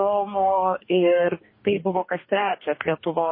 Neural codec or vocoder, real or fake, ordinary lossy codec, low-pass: codec, 44.1 kHz, 2.6 kbps, DAC; fake; MP3, 24 kbps; 5.4 kHz